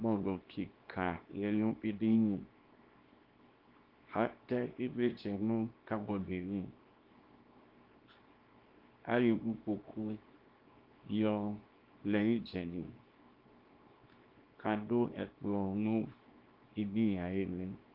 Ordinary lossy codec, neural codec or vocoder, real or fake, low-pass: Opus, 32 kbps; codec, 24 kHz, 0.9 kbps, WavTokenizer, small release; fake; 5.4 kHz